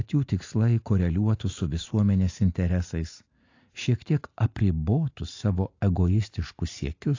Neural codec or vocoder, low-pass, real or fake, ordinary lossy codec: none; 7.2 kHz; real; AAC, 48 kbps